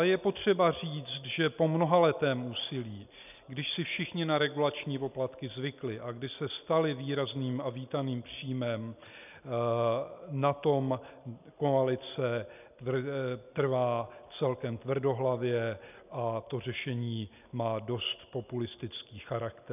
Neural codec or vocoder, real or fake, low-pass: none; real; 3.6 kHz